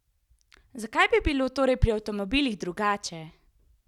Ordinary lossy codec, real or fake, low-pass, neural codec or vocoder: none; real; 19.8 kHz; none